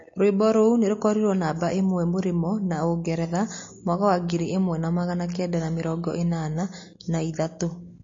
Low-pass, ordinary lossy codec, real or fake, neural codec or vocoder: 7.2 kHz; MP3, 32 kbps; real; none